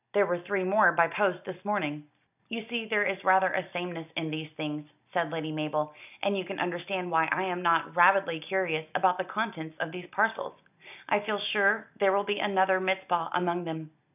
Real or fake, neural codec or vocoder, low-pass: real; none; 3.6 kHz